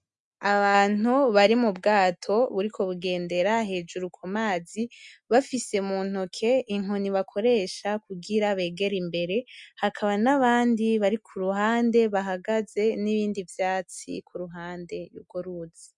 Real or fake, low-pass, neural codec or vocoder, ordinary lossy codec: real; 10.8 kHz; none; MP3, 64 kbps